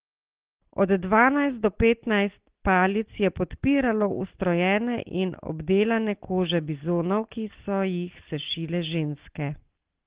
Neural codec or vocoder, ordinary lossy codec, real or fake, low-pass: none; Opus, 16 kbps; real; 3.6 kHz